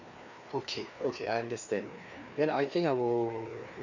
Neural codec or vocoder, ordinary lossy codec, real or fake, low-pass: codec, 16 kHz, 2 kbps, FreqCodec, larger model; none; fake; 7.2 kHz